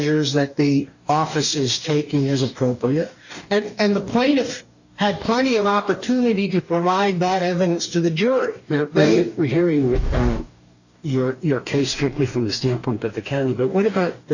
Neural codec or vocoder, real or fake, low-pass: codec, 44.1 kHz, 2.6 kbps, DAC; fake; 7.2 kHz